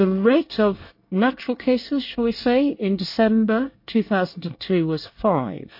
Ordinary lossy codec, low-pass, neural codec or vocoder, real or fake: MP3, 32 kbps; 5.4 kHz; codec, 24 kHz, 1 kbps, SNAC; fake